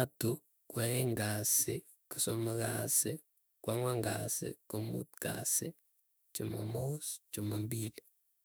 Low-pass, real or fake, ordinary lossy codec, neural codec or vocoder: none; fake; none; autoencoder, 48 kHz, 32 numbers a frame, DAC-VAE, trained on Japanese speech